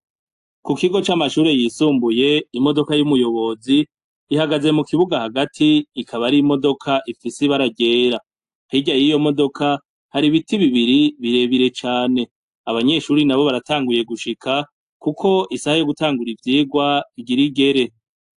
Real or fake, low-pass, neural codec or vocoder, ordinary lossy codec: real; 10.8 kHz; none; AAC, 64 kbps